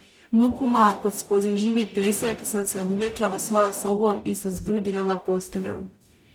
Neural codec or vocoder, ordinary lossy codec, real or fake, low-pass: codec, 44.1 kHz, 0.9 kbps, DAC; none; fake; 19.8 kHz